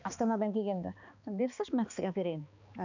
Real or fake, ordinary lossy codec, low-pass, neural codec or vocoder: fake; none; 7.2 kHz; codec, 16 kHz, 2 kbps, X-Codec, HuBERT features, trained on balanced general audio